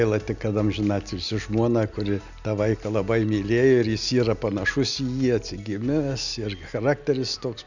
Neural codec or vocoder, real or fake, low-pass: none; real; 7.2 kHz